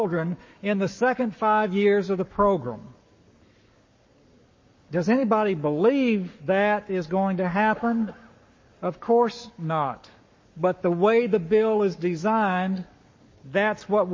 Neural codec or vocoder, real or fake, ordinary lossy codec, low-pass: codec, 44.1 kHz, 7.8 kbps, Pupu-Codec; fake; MP3, 32 kbps; 7.2 kHz